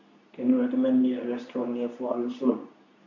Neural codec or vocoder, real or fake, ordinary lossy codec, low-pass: codec, 24 kHz, 0.9 kbps, WavTokenizer, medium speech release version 2; fake; none; 7.2 kHz